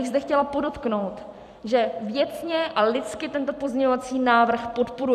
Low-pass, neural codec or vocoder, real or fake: 14.4 kHz; vocoder, 44.1 kHz, 128 mel bands every 256 samples, BigVGAN v2; fake